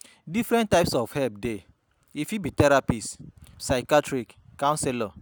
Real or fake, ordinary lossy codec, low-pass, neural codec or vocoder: real; none; none; none